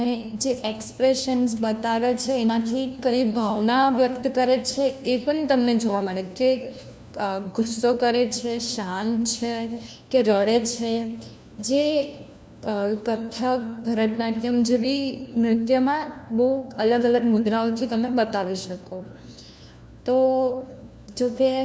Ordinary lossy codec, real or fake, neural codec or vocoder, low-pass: none; fake; codec, 16 kHz, 1 kbps, FunCodec, trained on LibriTTS, 50 frames a second; none